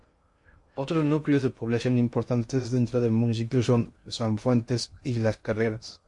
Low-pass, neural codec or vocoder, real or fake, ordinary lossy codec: 10.8 kHz; codec, 16 kHz in and 24 kHz out, 0.6 kbps, FocalCodec, streaming, 2048 codes; fake; MP3, 48 kbps